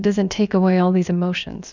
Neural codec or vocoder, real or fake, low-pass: codec, 16 kHz, about 1 kbps, DyCAST, with the encoder's durations; fake; 7.2 kHz